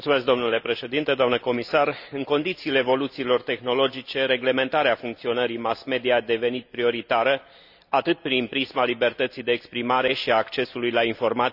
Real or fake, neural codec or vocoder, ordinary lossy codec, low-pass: real; none; none; 5.4 kHz